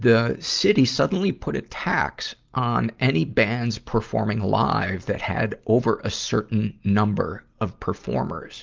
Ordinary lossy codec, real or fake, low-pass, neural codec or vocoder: Opus, 32 kbps; real; 7.2 kHz; none